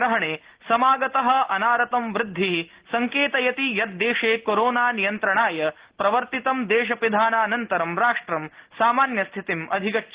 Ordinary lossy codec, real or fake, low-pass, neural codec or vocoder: Opus, 16 kbps; real; 3.6 kHz; none